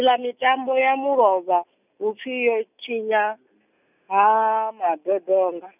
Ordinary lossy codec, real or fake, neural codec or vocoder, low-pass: none; real; none; 3.6 kHz